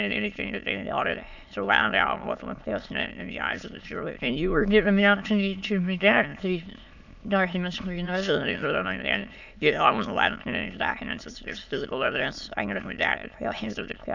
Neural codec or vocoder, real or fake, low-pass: autoencoder, 22.05 kHz, a latent of 192 numbers a frame, VITS, trained on many speakers; fake; 7.2 kHz